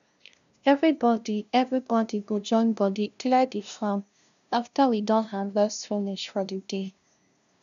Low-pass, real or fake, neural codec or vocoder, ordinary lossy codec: 7.2 kHz; fake; codec, 16 kHz, 0.5 kbps, FunCodec, trained on LibriTTS, 25 frames a second; none